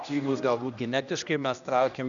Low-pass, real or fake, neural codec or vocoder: 7.2 kHz; fake; codec, 16 kHz, 1 kbps, X-Codec, HuBERT features, trained on general audio